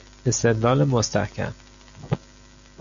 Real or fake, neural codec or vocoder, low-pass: real; none; 7.2 kHz